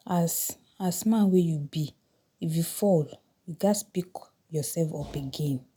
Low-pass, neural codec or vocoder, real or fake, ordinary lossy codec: none; none; real; none